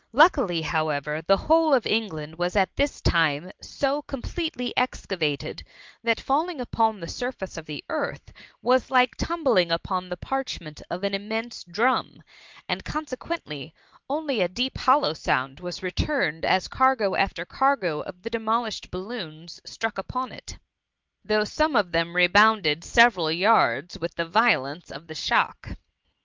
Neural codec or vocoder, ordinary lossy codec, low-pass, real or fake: none; Opus, 24 kbps; 7.2 kHz; real